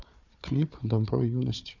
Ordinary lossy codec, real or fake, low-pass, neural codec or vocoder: none; fake; 7.2 kHz; codec, 16 kHz, 4 kbps, FunCodec, trained on Chinese and English, 50 frames a second